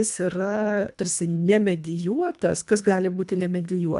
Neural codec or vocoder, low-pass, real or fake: codec, 24 kHz, 1.5 kbps, HILCodec; 10.8 kHz; fake